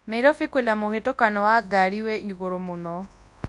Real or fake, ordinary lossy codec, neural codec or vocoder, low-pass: fake; none; codec, 24 kHz, 0.9 kbps, WavTokenizer, large speech release; 10.8 kHz